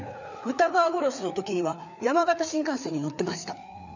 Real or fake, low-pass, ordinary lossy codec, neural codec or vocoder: fake; 7.2 kHz; none; codec, 16 kHz, 4 kbps, FreqCodec, larger model